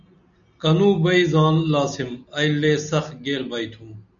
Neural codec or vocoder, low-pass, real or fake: none; 7.2 kHz; real